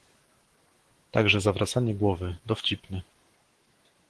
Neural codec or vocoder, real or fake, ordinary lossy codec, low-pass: autoencoder, 48 kHz, 128 numbers a frame, DAC-VAE, trained on Japanese speech; fake; Opus, 16 kbps; 10.8 kHz